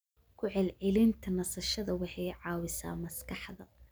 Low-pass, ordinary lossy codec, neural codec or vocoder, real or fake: none; none; none; real